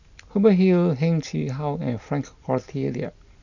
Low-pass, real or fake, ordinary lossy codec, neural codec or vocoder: 7.2 kHz; real; none; none